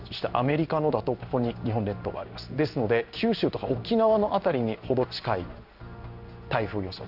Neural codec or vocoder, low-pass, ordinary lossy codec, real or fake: codec, 16 kHz in and 24 kHz out, 1 kbps, XY-Tokenizer; 5.4 kHz; none; fake